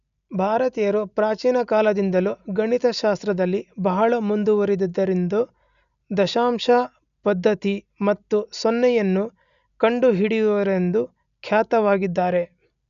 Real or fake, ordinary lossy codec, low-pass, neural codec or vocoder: real; none; 7.2 kHz; none